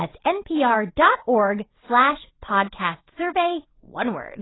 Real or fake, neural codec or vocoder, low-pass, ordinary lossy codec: fake; vocoder, 22.05 kHz, 80 mel bands, WaveNeXt; 7.2 kHz; AAC, 16 kbps